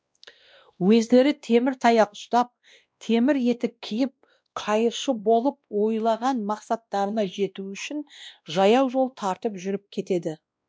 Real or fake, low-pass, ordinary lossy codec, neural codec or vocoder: fake; none; none; codec, 16 kHz, 1 kbps, X-Codec, WavLM features, trained on Multilingual LibriSpeech